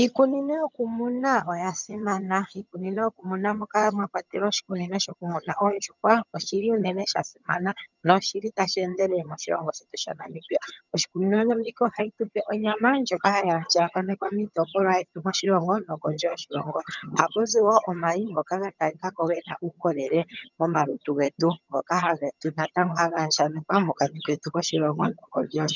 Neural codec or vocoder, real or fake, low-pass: vocoder, 22.05 kHz, 80 mel bands, HiFi-GAN; fake; 7.2 kHz